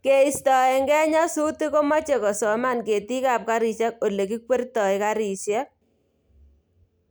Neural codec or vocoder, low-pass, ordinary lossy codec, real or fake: none; none; none; real